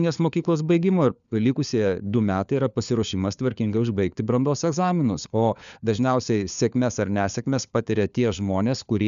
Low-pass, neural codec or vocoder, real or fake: 7.2 kHz; codec, 16 kHz, 4 kbps, FunCodec, trained on LibriTTS, 50 frames a second; fake